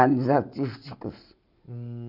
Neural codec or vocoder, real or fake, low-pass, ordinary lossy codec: none; real; 5.4 kHz; none